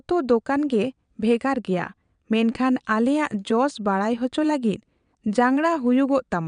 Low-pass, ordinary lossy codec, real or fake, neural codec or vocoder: 9.9 kHz; none; fake; vocoder, 22.05 kHz, 80 mel bands, WaveNeXt